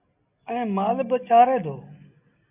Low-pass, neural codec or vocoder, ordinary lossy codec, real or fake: 3.6 kHz; none; AAC, 32 kbps; real